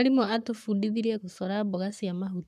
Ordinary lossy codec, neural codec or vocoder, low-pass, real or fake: none; codec, 44.1 kHz, 7.8 kbps, Pupu-Codec; 14.4 kHz; fake